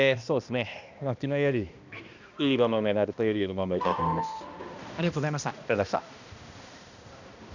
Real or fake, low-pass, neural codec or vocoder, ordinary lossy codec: fake; 7.2 kHz; codec, 16 kHz, 1 kbps, X-Codec, HuBERT features, trained on balanced general audio; none